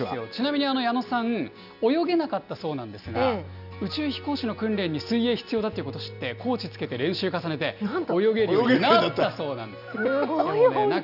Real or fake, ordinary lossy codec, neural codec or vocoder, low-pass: real; none; none; 5.4 kHz